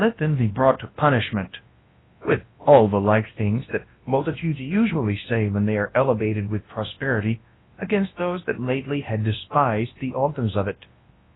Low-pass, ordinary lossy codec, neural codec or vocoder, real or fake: 7.2 kHz; AAC, 16 kbps; codec, 24 kHz, 0.9 kbps, WavTokenizer, large speech release; fake